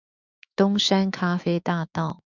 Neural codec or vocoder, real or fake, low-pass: autoencoder, 48 kHz, 128 numbers a frame, DAC-VAE, trained on Japanese speech; fake; 7.2 kHz